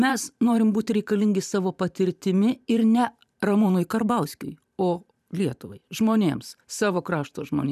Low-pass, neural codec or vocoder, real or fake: 14.4 kHz; vocoder, 44.1 kHz, 128 mel bands every 512 samples, BigVGAN v2; fake